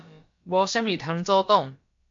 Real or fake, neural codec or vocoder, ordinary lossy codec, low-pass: fake; codec, 16 kHz, about 1 kbps, DyCAST, with the encoder's durations; MP3, 48 kbps; 7.2 kHz